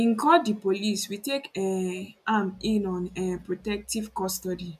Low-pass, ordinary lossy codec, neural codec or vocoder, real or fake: 14.4 kHz; none; none; real